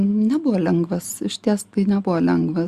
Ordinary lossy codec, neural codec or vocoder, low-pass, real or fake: Opus, 64 kbps; none; 14.4 kHz; real